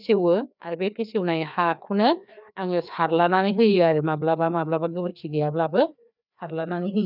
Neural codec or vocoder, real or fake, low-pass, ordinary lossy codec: codec, 16 kHz, 2 kbps, FreqCodec, larger model; fake; 5.4 kHz; none